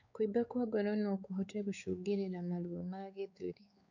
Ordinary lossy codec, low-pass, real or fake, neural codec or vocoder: none; 7.2 kHz; fake; codec, 16 kHz, 4 kbps, X-Codec, WavLM features, trained on Multilingual LibriSpeech